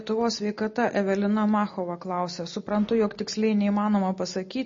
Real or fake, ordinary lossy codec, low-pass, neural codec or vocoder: real; MP3, 32 kbps; 7.2 kHz; none